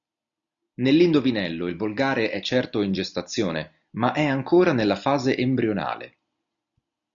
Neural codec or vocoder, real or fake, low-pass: none; real; 7.2 kHz